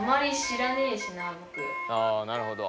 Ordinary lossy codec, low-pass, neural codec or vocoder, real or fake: none; none; none; real